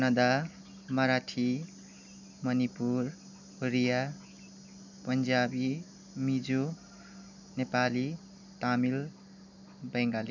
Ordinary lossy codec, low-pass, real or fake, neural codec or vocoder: none; 7.2 kHz; real; none